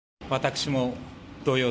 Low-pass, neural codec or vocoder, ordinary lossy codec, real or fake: none; none; none; real